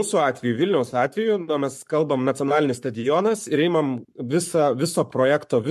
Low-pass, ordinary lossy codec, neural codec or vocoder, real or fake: 14.4 kHz; MP3, 64 kbps; codec, 44.1 kHz, 7.8 kbps, Pupu-Codec; fake